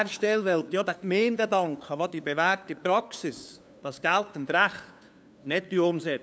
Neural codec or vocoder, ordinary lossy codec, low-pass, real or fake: codec, 16 kHz, 2 kbps, FunCodec, trained on LibriTTS, 25 frames a second; none; none; fake